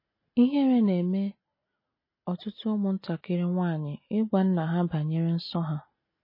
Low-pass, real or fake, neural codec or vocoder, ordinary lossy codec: 5.4 kHz; real; none; MP3, 24 kbps